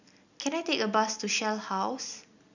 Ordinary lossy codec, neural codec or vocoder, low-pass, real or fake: none; none; 7.2 kHz; real